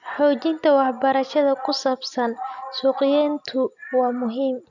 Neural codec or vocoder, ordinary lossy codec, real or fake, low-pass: none; none; real; 7.2 kHz